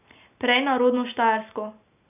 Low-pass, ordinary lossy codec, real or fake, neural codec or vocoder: 3.6 kHz; none; real; none